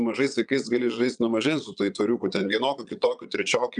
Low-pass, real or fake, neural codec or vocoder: 9.9 kHz; fake; vocoder, 22.05 kHz, 80 mel bands, Vocos